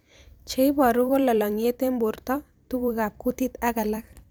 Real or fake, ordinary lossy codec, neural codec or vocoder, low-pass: fake; none; vocoder, 44.1 kHz, 128 mel bands every 256 samples, BigVGAN v2; none